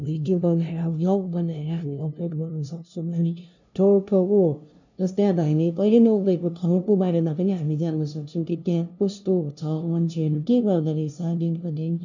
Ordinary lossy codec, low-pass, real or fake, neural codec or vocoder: none; 7.2 kHz; fake; codec, 16 kHz, 0.5 kbps, FunCodec, trained on LibriTTS, 25 frames a second